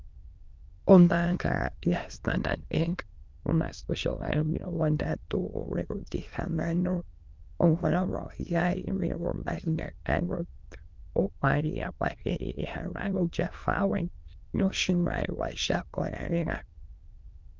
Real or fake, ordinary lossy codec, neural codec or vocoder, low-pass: fake; Opus, 24 kbps; autoencoder, 22.05 kHz, a latent of 192 numbers a frame, VITS, trained on many speakers; 7.2 kHz